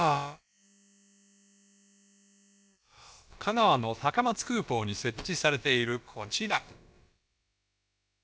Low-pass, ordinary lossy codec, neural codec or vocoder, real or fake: none; none; codec, 16 kHz, about 1 kbps, DyCAST, with the encoder's durations; fake